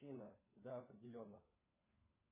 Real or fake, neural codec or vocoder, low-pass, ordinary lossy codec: fake; codec, 16 kHz, 4 kbps, FunCodec, trained on LibriTTS, 50 frames a second; 3.6 kHz; MP3, 16 kbps